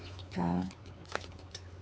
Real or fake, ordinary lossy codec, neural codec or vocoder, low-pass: fake; none; codec, 16 kHz, 4 kbps, X-Codec, WavLM features, trained on Multilingual LibriSpeech; none